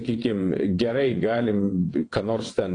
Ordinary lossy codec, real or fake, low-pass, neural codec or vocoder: AAC, 32 kbps; fake; 9.9 kHz; vocoder, 22.05 kHz, 80 mel bands, WaveNeXt